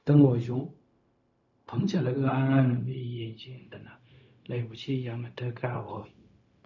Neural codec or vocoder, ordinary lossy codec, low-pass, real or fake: codec, 16 kHz, 0.4 kbps, LongCat-Audio-Codec; none; 7.2 kHz; fake